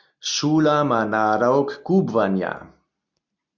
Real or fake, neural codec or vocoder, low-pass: real; none; 7.2 kHz